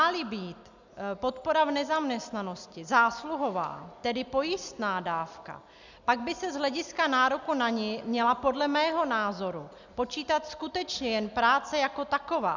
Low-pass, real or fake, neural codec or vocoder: 7.2 kHz; real; none